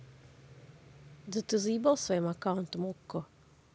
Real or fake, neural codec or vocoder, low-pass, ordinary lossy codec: real; none; none; none